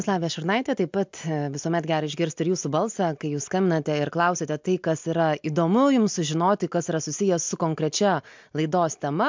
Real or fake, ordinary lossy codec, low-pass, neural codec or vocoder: real; MP3, 64 kbps; 7.2 kHz; none